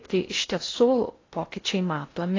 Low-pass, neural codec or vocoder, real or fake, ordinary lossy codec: 7.2 kHz; codec, 16 kHz in and 24 kHz out, 0.6 kbps, FocalCodec, streaming, 4096 codes; fake; AAC, 32 kbps